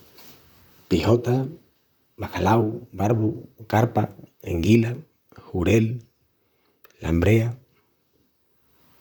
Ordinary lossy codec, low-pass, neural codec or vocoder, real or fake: none; none; vocoder, 44.1 kHz, 128 mel bands, Pupu-Vocoder; fake